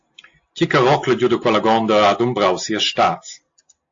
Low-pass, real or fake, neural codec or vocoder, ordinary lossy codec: 7.2 kHz; real; none; AAC, 48 kbps